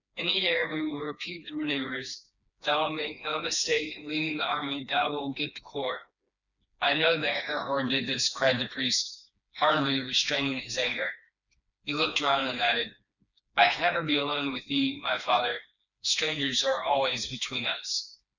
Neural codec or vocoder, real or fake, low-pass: codec, 16 kHz, 2 kbps, FreqCodec, smaller model; fake; 7.2 kHz